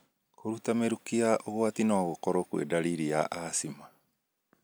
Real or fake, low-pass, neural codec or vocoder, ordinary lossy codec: real; none; none; none